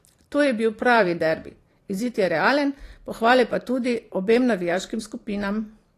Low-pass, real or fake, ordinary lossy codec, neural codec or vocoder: 14.4 kHz; fake; AAC, 48 kbps; vocoder, 44.1 kHz, 128 mel bands every 512 samples, BigVGAN v2